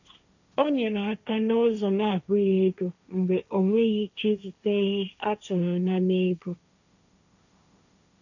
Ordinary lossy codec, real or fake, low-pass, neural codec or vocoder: none; fake; 7.2 kHz; codec, 16 kHz, 1.1 kbps, Voila-Tokenizer